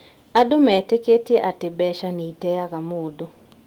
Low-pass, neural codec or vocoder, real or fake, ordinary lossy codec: 19.8 kHz; autoencoder, 48 kHz, 128 numbers a frame, DAC-VAE, trained on Japanese speech; fake; Opus, 24 kbps